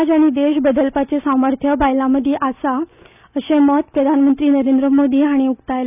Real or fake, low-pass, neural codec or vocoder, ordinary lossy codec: real; 3.6 kHz; none; none